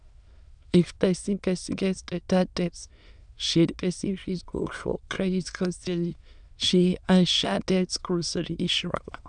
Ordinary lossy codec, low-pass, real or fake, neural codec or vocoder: none; 9.9 kHz; fake; autoencoder, 22.05 kHz, a latent of 192 numbers a frame, VITS, trained on many speakers